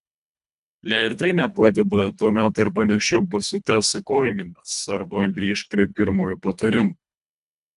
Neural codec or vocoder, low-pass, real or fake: codec, 24 kHz, 1.5 kbps, HILCodec; 10.8 kHz; fake